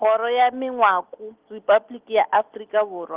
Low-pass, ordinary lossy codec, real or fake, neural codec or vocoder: 3.6 kHz; Opus, 16 kbps; real; none